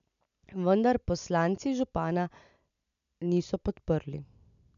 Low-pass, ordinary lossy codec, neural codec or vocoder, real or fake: 7.2 kHz; none; none; real